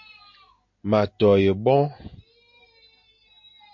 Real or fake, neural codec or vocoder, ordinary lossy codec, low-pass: real; none; MP3, 48 kbps; 7.2 kHz